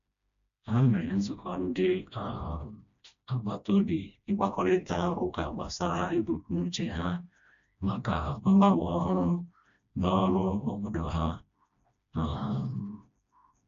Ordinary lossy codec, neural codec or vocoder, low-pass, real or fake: MP3, 64 kbps; codec, 16 kHz, 1 kbps, FreqCodec, smaller model; 7.2 kHz; fake